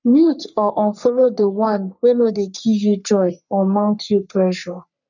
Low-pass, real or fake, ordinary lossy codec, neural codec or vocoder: 7.2 kHz; fake; none; codec, 44.1 kHz, 3.4 kbps, Pupu-Codec